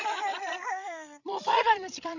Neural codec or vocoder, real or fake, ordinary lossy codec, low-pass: codec, 16 kHz, 16 kbps, FreqCodec, larger model; fake; none; 7.2 kHz